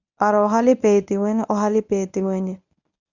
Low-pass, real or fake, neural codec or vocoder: 7.2 kHz; fake; codec, 24 kHz, 0.9 kbps, WavTokenizer, medium speech release version 1